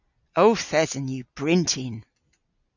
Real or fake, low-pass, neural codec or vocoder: real; 7.2 kHz; none